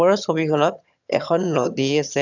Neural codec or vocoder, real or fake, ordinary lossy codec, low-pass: vocoder, 22.05 kHz, 80 mel bands, HiFi-GAN; fake; none; 7.2 kHz